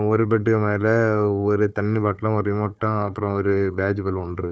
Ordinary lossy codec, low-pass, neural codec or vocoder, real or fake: none; none; codec, 16 kHz, 4 kbps, FunCodec, trained on Chinese and English, 50 frames a second; fake